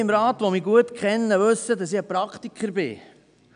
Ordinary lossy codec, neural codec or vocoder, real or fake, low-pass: none; none; real; 9.9 kHz